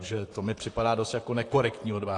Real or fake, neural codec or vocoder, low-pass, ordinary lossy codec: fake; vocoder, 44.1 kHz, 128 mel bands, Pupu-Vocoder; 10.8 kHz; AAC, 48 kbps